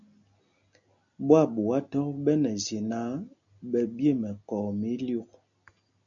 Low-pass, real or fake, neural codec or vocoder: 7.2 kHz; real; none